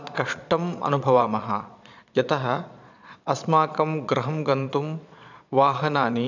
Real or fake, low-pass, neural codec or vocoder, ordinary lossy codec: fake; 7.2 kHz; vocoder, 22.05 kHz, 80 mel bands, Vocos; none